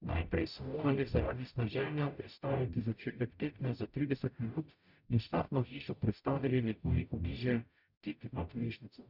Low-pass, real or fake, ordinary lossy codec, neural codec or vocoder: 5.4 kHz; fake; none; codec, 44.1 kHz, 0.9 kbps, DAC